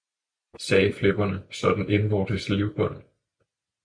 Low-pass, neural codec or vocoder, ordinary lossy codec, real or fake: 9.9 kHz; none; MP3, 64 kbps; real